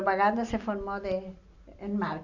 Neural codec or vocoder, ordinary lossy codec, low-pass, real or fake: none; none; 7.2 kHz; real